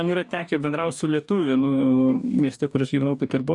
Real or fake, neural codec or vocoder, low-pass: fake; codec, 44.1 kHz, 2.6 kbps, DAC; 10.8 kHz